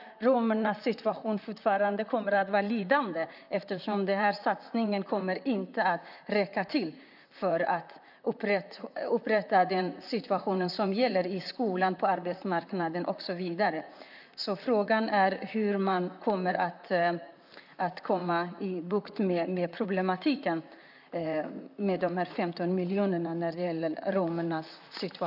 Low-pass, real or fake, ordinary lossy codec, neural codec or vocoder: 5.4 kHz; fake; none; vocoder, 44.1 kHz, 128 mel bands, Pupu-Vocoder